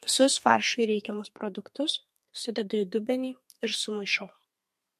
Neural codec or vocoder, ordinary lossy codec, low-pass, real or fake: codec, 32 kHz, 1.9 kbps, SNAC; MP3, 64 kbps; 14.4 kHz; fake